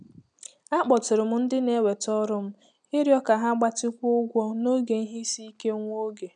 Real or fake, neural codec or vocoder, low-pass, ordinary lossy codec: real; none; 9.9 kHz; none